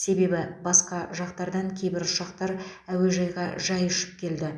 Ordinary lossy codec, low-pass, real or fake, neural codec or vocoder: none; none; real; none